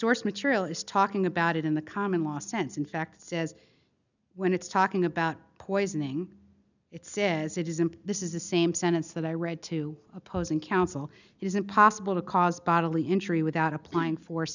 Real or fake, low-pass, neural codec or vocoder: real; 7.2 kHz; none